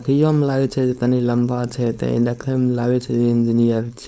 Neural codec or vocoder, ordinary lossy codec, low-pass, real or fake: codec, 16 kHz, 4.8 kbps, FACodec; none; none; fake